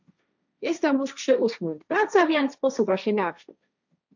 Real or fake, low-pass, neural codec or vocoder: fake; 7.2 kHz; codec, 16 kHz, 1.1 kbps, Voila-Tokenizer